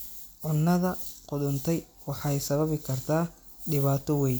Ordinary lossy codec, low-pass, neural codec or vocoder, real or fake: none; none; none; real